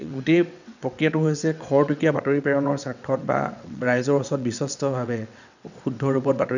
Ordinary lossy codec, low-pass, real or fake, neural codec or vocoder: none; 7.2 kHz; fake; vocoder, 22.05 kHz, 80 mel bands, WaveNeXt